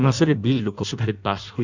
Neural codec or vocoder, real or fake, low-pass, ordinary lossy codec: codec, 16 kHz in and 24 kHz out, 0.6 kbps, FireRedTTS-2 codec; fake; 7.2 kHz; none